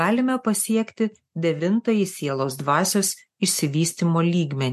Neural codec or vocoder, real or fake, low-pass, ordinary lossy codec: none; real; 14.4 kHz; MP3, 64 kbps